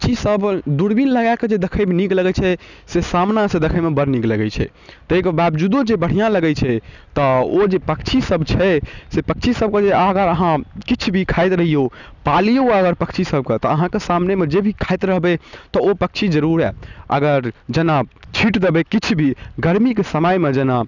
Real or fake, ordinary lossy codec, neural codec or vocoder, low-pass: real; none; none; 7.2 kHz